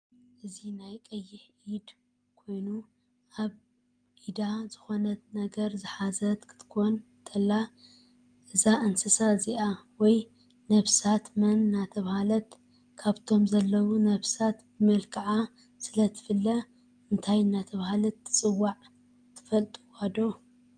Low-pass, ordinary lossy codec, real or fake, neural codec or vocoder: 9.9 kHz; Opus, 32 kbps; real; none